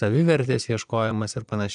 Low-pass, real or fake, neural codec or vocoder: 9.9 kHz; fake; vocoder, 22.05 kHz, 80 mel bands, Vocos